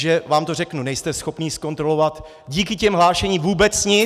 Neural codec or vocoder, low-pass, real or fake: none; 14.4 kHz; real